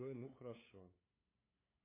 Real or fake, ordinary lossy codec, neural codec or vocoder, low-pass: fake; MP3, 24 kbps; codec, 16 kHz, 8 kbps, FunCodec, trained on LibriTTS, 25 frames a second; 3.6 kHz